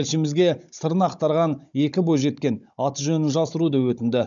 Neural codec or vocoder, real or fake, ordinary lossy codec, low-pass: codec, 16 kHz, 16 kbps, FunCodec, trained on Chinese and English, 50 frames a second; fake; none; 7.2 kHz